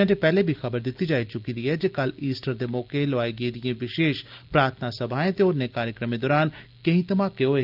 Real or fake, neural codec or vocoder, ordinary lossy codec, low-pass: real; none; Opus, 32 kbps; 5.4 kHz